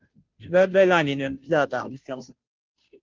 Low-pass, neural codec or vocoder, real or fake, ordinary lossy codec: 7.2 kHz; codec, 16 kHz, 0.5 kbps, FunCodec, trained on Chinese and English, 25 frames a second; fake; Opus, 32 kbps